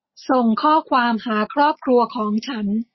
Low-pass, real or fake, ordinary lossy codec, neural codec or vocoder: 7.2 kHz; real; MP3, 24 kbps; none